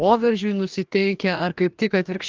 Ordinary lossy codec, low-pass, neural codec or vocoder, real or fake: Opus, 32 kbps; 7.2 kHz; codec, 44.1 kHz, 2.6 kbps, DAC; fake